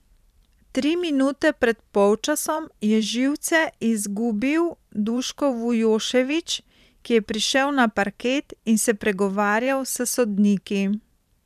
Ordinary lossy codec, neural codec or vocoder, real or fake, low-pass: none; vocoder, 44.1 kHz, 128 mel bands every 512 samples, BigVGAN v2; fake; 14.4 kHz